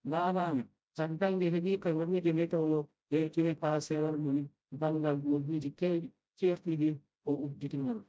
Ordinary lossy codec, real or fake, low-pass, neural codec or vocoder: none; fake; none; codec, 16 kHz, 0.5 kbps, FreqCodec, smaller model